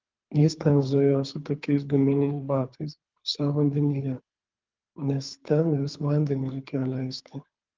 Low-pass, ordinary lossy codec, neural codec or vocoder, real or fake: 7.2 kHz; Opus, 24 kbps; codec, 24 kHz, 3 kbps, HILCodec; fake